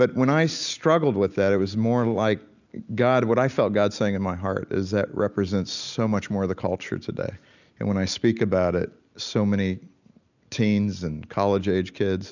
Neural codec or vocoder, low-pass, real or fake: none; 7.2 kHz; real